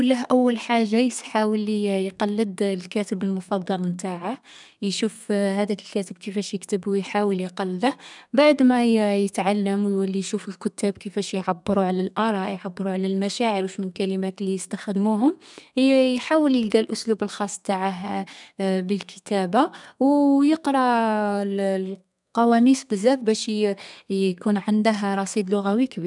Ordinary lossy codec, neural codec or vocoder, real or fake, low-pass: none; codec, 32 kHz, 1.9 kbps, SNAC; fake; 10.8 kHz